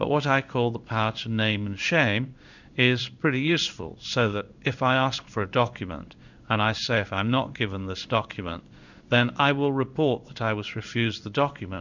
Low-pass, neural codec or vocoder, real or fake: 7.2 kHz; codec, 16 kHz in and 24 kHz out, 1 kbps, XY-Tokenizer; fake